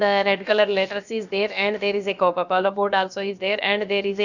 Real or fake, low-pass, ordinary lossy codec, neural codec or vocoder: fake; 7.2 kHz; none; codec, 16 kHz, about 1 kbps, DyCAST, with the encoder's durations